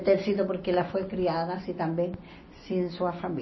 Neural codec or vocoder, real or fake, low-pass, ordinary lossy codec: none; real; 7.2 kHz; MP3, 24 kbps